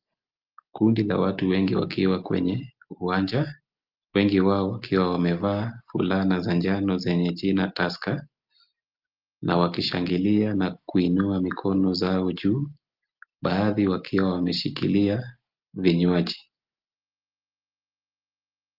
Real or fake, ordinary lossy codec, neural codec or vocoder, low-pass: real; Opus, 32 kbps; none; 5.4 kHz